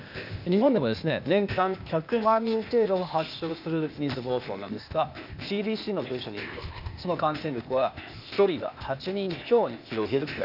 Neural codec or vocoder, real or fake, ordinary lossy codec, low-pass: codec, 16 kHz, 0.8 kbps, ZipCodec; fake; none; 5.4 kHz